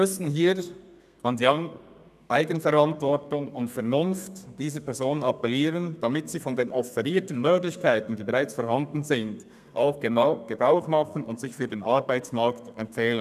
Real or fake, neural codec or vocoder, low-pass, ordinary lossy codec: fake; codec, 32 kHz, 1.9 kbps, SNAC; 14.4 kHz; none